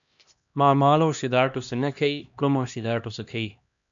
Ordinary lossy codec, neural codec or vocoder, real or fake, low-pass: MP3, 64 kbps; codec, 16 kHz, 1 kbps, X-Codec, HuBERT features, trained on LibriSpeech; fake; 7.2 kHz